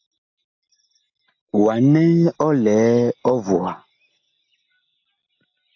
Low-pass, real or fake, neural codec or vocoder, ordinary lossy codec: 7.2 kHz; real; none; AAC, 48 kbps